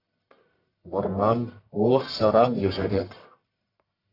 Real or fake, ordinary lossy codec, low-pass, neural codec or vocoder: fake; AAC, 32 kbps; 5.4 kHz; codec, 44.1 kHz, 1.7 kbps, Pupu-Codec